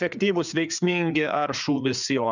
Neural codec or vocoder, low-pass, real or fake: codec, 16 kHz, 4 kbps, FreqCodec, larger model; 7.2 kHz; fake